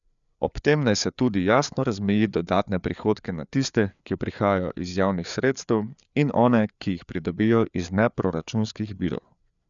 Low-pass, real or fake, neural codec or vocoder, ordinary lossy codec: 7.2 kHz; fake; codec, 16 kHz, 4 kbps, FreqCodec, larger model; none